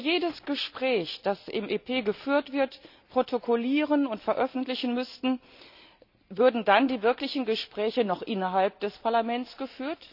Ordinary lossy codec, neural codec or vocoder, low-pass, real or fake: none; none; 5.4 kHz; real